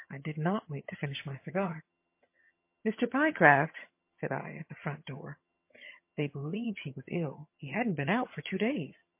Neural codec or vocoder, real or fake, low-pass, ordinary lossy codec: vocoder, 22.05 kHz, 80 mel bands, HiFi-GAN; fake; 3.6 kHz; MP3, 24 kbps